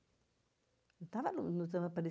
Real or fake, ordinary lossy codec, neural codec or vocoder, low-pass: real; none; none; none